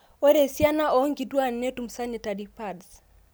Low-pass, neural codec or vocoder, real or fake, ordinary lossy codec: none; none; real; none